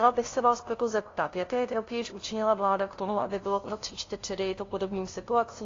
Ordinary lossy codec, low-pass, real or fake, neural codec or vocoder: AAC, 32 kbps; 7.2 kHz; fake; codec, 16 kHz, 0.5 kbps, FunCodec, trained on LibriTTS, 25 frames a second